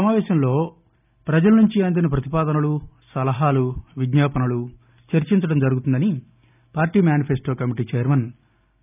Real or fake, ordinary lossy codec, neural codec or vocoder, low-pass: real; none; none; 3.6 kHz